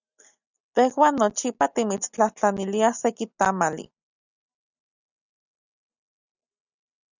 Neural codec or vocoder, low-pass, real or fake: none; 7.2 kHz; real